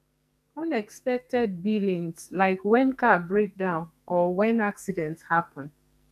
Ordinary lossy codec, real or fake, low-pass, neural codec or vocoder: none; fake; 14.4 kHz; codec, 44.1 kHz, 2.6 kbps, SNAC